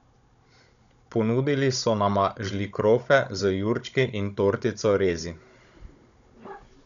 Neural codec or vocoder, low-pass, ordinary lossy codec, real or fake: codec, 16 kHz, 16 kbps, FunCodec, trained on Chinese and English, 50 frames a second; 7.2 kHz; none; fake